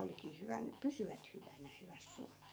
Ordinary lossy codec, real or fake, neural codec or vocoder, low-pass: none; fake; codec, 44.1 kHz, 7.8 kbps, DAC; none